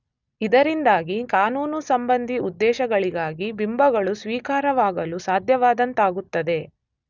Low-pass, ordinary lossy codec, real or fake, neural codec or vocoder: 7.2 kHz; none; real; none